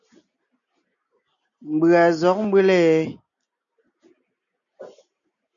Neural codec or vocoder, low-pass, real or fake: none; 7.2 kHz; real